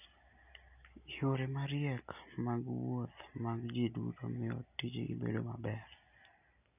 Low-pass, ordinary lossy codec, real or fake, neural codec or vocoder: 3.6 kHz; none; real; none